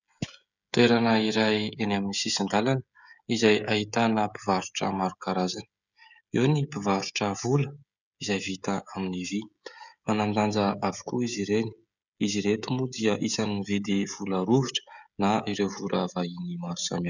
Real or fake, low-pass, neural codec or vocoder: fake; 7.2 kHz; codec, 16 kHz, 16 kbps, FreqCodec, smaller model